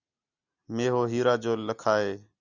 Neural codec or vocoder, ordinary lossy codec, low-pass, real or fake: none; Opus, 64 kbps; 7.2 kHz; real